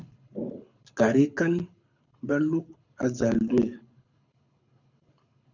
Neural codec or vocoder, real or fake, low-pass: codec, 24 kHz, 6 kbps, HILCodec; fake; 7.2 kHz